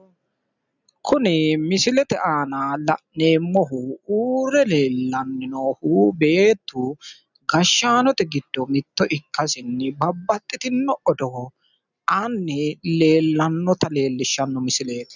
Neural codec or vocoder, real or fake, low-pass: none; real; 7.2 kHz